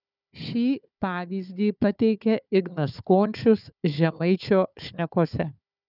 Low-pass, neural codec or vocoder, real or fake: 5.4 kHz; codec, 16 kHz, 4 kbps, FunCodec, trained on Chinese and English, 50 frames a second; fake